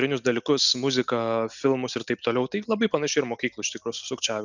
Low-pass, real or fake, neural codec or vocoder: 7.2 kHz; real; none